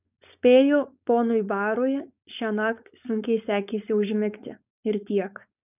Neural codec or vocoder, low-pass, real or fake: codec, 16 kHz, 4.8 kbps, FACodec; 3.6 kHz; fake